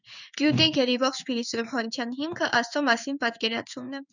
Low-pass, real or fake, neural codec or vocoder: 7.2 kHz; fake; codec, 16 kHz in and 24 kHz out, 1 kbps, XY-Tokenizer